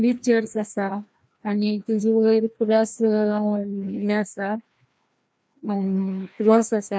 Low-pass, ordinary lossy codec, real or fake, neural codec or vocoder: none; none; fake; codec, 16 kHz, 1 kbps, FreqCodec, larger model